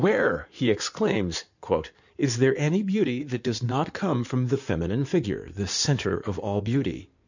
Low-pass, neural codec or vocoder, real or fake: 7.2 kHz; codec, 16 kHz in and 24 kHz out, 2.2 kbps, FireRedTTS-2 codec; fake